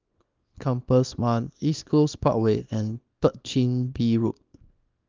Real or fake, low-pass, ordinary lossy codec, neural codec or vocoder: fake; 7.2 kHz; Opus, 24 kbps; codec, 24 kHz, 0.9 kbps, WavTokenizer, small release